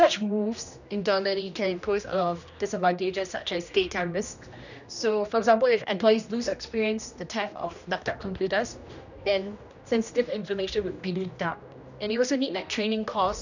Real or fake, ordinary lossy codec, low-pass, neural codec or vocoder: fake; none; 7.2 kHz; codec, 16 kHz, 1 kbps, X-Codec, HuBERT features, trained on general audio